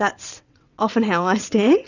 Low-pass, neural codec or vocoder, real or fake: 7.2 kHz; vocoder, 22.05 kHz, 80 mel bands, WaveNeXt; fake